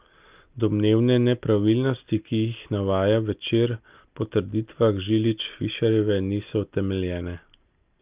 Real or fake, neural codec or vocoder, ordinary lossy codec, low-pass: real; none; Opus, 24 kbps; 3.6 kHz